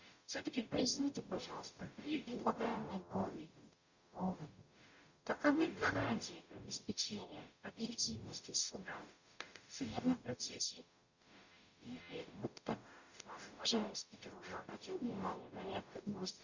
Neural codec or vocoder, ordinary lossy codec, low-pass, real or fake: codec, 44.1 kHz, 0.9 kbps, DAC; Opus, 64 kbps; 7.2 kHz; fake